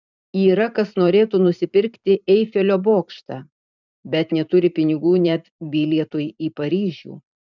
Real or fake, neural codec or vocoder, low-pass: fake; vocoder, 44.1 kHz, 128 mel bands every 512 samples, BigVGAN v2; 7.2 kHz